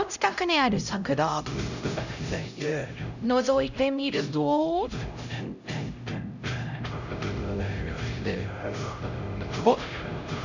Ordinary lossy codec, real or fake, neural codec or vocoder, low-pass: none; fake; codec, 16 kHz, 0.5 kbps, X-Codec, HuBERT features, trained on LibriSpeech; 7.2 kHz